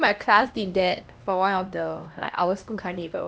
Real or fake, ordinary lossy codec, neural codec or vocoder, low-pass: fake; none; codec, 16 kHz, 1 kbps, X-Codec, HuBERT features, trained on LibriSpeech; none